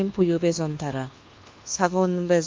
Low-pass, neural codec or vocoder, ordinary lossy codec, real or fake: 7.2 kHz; codec, 24 kHz, 1.2 kbps, DualCodec; Opus, 32 kbps; fake